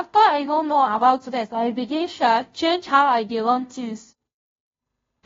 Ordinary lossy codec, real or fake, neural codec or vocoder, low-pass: AAC, 24 kbps; fake; codec, 16 kHz, 0.5 kbps, FunCodec, trained on Chinese and English, 25 frames a second; 7.2 kHz